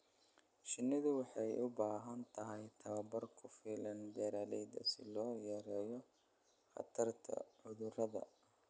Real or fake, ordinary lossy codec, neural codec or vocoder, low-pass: real; none; none; none